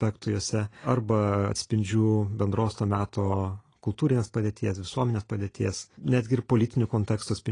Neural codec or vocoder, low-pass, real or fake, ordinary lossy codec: none; 9.9 kHz; real; AAC, 32 kbps